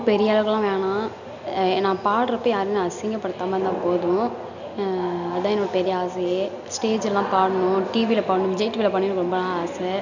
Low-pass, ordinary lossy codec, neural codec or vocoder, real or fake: 7.2 kHz; none; none; real